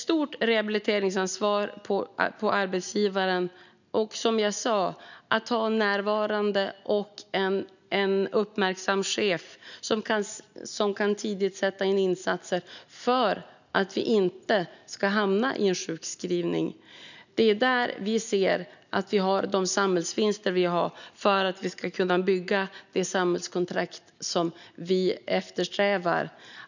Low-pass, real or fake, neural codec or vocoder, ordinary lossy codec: 7.2 kHz; real; none; none